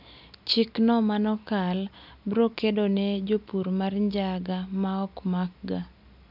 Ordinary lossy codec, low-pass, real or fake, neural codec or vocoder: none; 5.4 kHz; real; none